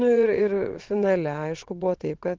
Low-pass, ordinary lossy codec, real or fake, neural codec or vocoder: 7.2 kHz; Opus, 16 kbps; fake; vocoder, 22.05 kHz, 80 mel bands, WaveNeXt